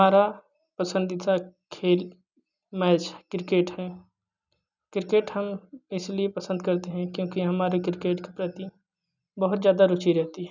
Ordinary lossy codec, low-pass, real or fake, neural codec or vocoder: none; 7.2 kHz; real; none